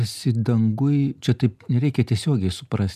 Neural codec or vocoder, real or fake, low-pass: none; real; 14.4 kHz